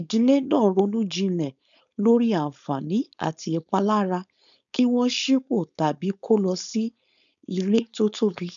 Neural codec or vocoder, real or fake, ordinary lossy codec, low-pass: codec, 16 kHz, 4.8 kbps, FACodec; fake; none; 7.2 kHz